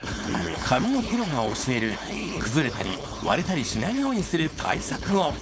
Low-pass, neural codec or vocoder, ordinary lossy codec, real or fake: none; codec, 16 kHz, 4.8 kbps, FACodec; none; fake